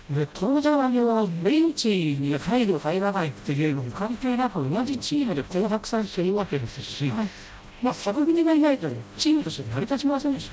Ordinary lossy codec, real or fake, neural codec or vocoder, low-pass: none; fake; codec, 16 kHz, 0.5 kbps, FreqCodec, smaller model; none